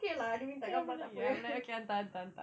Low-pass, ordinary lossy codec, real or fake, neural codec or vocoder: none; none; real; none